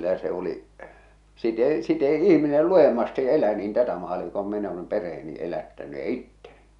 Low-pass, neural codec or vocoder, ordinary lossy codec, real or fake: 10.8 kHz; none; none; real